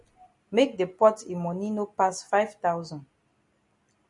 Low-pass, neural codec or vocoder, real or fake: 10.8 kHz; none; real